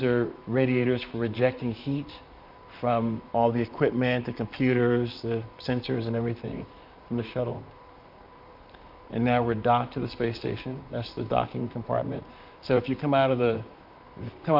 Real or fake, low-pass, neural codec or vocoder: fake; 5.4 kHz; vocoder, 44.1 kHz, 128 mel bands, Pupu-Vocoder